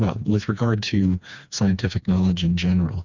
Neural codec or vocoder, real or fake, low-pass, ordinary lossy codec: codec, 16 kHz, 2 kbps, FreqCodec, smaller model; fake; 7.2 kHz; Opus, 64 kbps